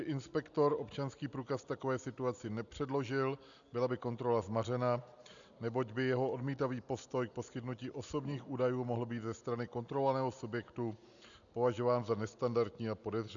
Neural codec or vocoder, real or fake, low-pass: none; real; 7.2 kHz